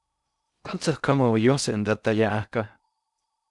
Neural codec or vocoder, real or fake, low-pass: codec, 16 kHz in and 24 kHz out, 0.6 kbps, FocalCodec, streaming, 2048 codes; fake; 10.8 kHz